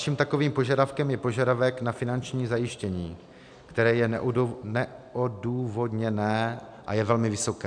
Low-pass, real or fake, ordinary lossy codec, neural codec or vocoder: 9.9 kHz; real; MP3, 96 kbps; none